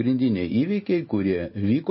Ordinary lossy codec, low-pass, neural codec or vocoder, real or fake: MP3, 24 kbps; 7.2 kHz; none; real